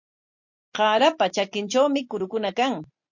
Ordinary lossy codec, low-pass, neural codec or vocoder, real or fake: MP3, 48 kbps; 7.2 kHz; none; real